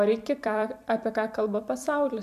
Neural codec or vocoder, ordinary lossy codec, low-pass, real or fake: vocoder, 44.1 kHz, 128 mel bands every 256 samples, BigVGAN v2; AAC, 96 kbps; 14.4 kHz; fake